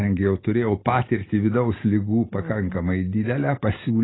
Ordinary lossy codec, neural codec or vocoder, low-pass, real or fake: AAC, 16 kbps; none; 7.2 kHz; real